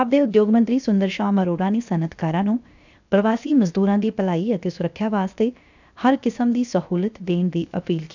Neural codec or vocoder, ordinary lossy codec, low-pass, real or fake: codec, 16 kHz, 0.7 kbps, FocalCodec; none; 7.2 kHz; fake